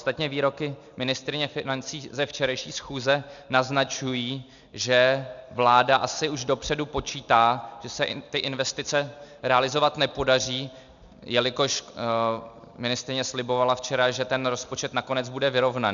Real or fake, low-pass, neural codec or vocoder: real; 7.2 kHz; none